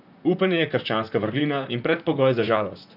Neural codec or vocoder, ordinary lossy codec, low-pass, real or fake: vocoder, 44.1 kHz, 128 mel bands, Pupu-Vocoder; none; 5.4 kHz; fake